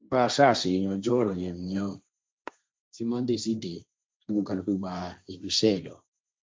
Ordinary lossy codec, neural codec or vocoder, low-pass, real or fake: none; codec, 16 kHz, 1.1 kbps, Voila-Tokenizer; none; fake